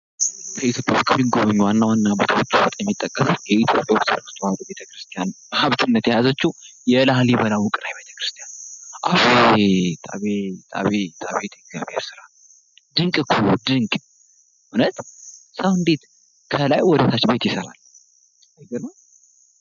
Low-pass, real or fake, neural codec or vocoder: 7.2 kHz; real; none